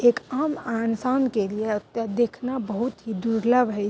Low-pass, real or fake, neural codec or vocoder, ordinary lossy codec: none; real; none; none